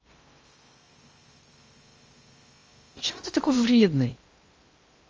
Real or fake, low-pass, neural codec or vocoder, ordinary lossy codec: fake; 7.2 kHz; codec, 16 kHz in and 24 kHz out, 0.8 kbps, FocalCodec, streaming, 65536 codes; Opus, 24 kbps